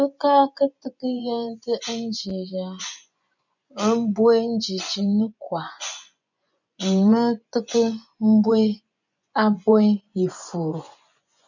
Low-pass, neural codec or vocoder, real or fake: 7.2 kHz; vocoder, 24 kHz, 100 mel bands, Vocos; fake